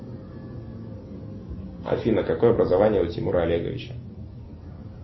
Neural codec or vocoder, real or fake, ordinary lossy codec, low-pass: none; real; MP3, 24 kbps; 7.2 kHz